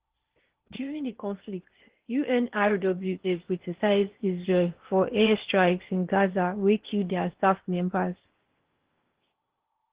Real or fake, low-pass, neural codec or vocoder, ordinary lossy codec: fake; 3.6 kHz; codec, 16 kHz in and 24 kHz out, 0.8 kbps, FocalCodec, streaming, 65536 codes; Opus, 16 kbps